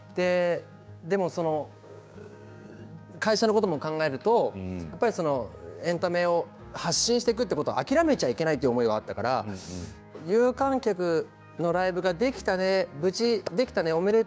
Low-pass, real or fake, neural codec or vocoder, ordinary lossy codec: none; fake; codec, 16 kHz, 6 kbps, DAC; none